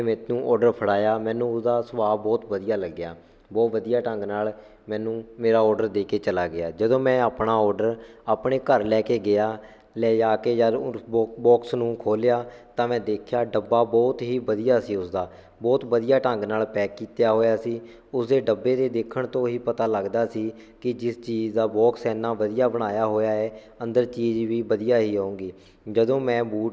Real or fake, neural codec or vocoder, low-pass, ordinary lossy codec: real; none; none; none